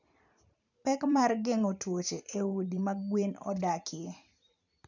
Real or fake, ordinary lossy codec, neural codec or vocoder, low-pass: fake; none; vocoder, 44.1 kHz, 128 mel bands every 512 samples, BigVGAN v2; 7.2 kHz